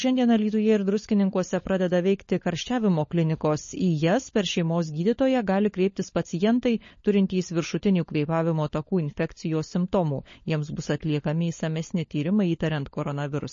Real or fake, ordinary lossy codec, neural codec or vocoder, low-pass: fake; MP3, 32 kbps; codec, 16 kHz, 16 kbps, FunCodec, trained on LibriTTS, 50 frames a second; 7.2 kHz